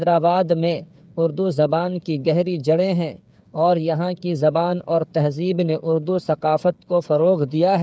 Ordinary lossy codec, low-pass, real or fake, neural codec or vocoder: none; none; fake; codec, 16 kHz, 8 kbps, FreqCodec, smaller model